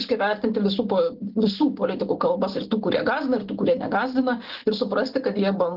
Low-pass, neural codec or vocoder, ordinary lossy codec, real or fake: 5.4 kHz; none; Opus, 16 kbps; real